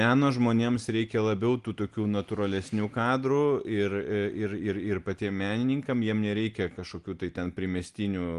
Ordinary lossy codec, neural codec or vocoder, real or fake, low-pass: Opus, 24 kbps; none; real; 10.8 kHz